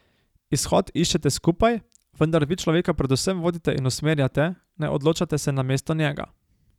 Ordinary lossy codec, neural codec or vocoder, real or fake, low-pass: none; none; real; 19.8 kHz